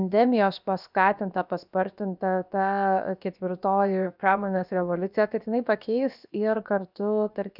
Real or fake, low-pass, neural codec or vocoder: fake; 5.4 kHz; codec, 16 kHz, 0.7 kbps, FocalCodec